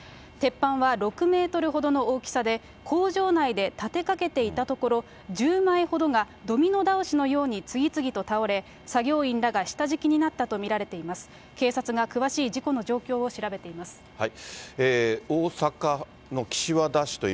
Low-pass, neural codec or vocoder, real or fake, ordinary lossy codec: none; none; real; none